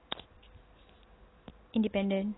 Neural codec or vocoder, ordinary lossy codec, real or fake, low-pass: none; AAC, 16 kbps; real; 7.2 kHz